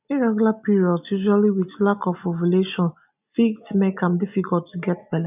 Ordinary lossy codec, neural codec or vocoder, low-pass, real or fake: none; none; 3.6 kHz; real